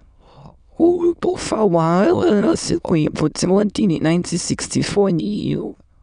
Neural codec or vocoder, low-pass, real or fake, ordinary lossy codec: autoencoder, 22.05 kHz, a latent of 192 numbers a frame, VITS, trained on many speakers; 9.9 kHz; fake; none